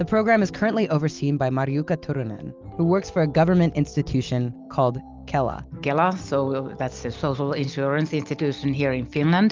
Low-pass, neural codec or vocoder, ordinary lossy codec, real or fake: 7.2 kHz; none; Opus, 24 kbps; real